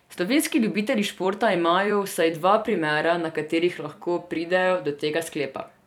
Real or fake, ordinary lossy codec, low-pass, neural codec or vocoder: fake; none; 19.8 kHz; vocoder, 44.1 kHz, 128 mel bands every 256 samples, BigVGAN v2